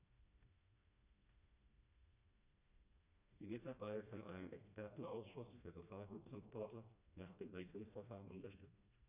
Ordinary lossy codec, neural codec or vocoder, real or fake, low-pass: none; codec, 16 kHz, 1 kbps, FreqCodec, smaller model; fake; 3.6 kHz